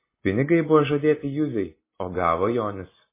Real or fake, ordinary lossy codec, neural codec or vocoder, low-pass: real; MP3, 16 kbps; none; 3.6 kHz